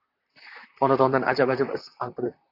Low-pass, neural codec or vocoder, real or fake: 5.4 kHz; vocoder, 44.1 kHz, 128 mel bands, Pupu-Vocoder; fake